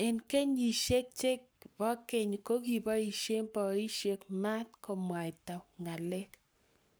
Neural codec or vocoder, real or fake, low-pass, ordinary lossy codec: codec, 44.1 kHz, 7.8 kbps, Pupu-Codec; fake; none; none